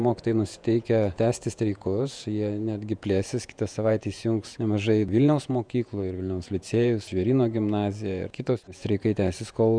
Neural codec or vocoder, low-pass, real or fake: vocoder, 48 kHz, 128 mel bands, Vocos; 9.9 kHz; fake